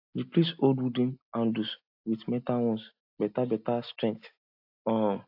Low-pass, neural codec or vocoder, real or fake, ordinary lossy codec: 5.4 kHz; none; real; AAC, 48 kbps